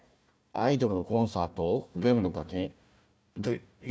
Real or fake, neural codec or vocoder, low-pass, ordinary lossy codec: fake; codec, 16 kHz, 1 kbps, FunCodec, trained on Chinese and English, 50 frames a second; none; none